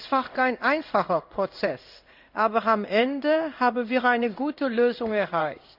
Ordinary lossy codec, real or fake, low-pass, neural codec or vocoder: none; fake; 5.4 kHz; codec, 16 kHz in and 24 kHz out, 1 kbps, XY-Tokenizer